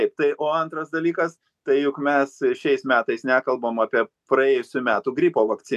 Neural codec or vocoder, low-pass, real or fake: none; 14.4 kHz; real